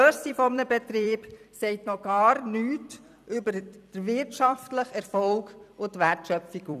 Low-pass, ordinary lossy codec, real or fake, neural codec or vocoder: 14.4 kHz; none; fake; vocoder, 44.1 kHz, 128 mel bands every 256 samples, BigVGAN v2